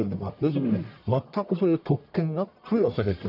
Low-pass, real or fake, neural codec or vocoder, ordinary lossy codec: 5.4 kHz; fake; codec, 44.1 kHz, 1.7 kbps, Pupu-Codec; none